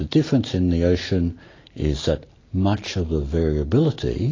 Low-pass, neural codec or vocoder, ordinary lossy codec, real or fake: 7.2 kHz; none; AAC, 32 kbps; real